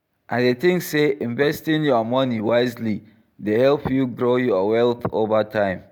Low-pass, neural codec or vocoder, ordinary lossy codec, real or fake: none; none; none; real